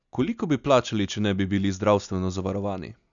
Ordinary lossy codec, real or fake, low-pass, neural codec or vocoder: none; real; 7.2 kHz; none